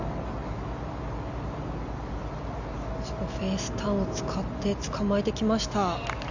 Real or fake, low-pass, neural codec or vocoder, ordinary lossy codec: real; 7.2 kHz; none; none